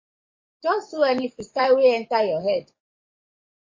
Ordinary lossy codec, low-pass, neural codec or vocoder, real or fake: MP3, 32 kbps; 7.2 kHz; codec, 44.1 kHz, 7.8 kbps, DAC; fake